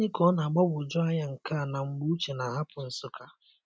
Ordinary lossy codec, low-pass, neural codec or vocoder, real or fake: none; none; none; real